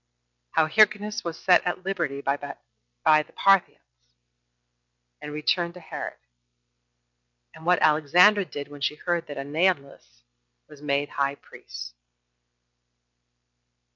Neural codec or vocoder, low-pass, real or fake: none; 7.2 kHz; real